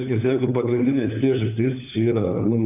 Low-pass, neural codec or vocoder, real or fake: 3.6 kHz; codec, 16 kHz, 4 kbps, FunCodec, trained on LibriTTS, 50 frames a second; fake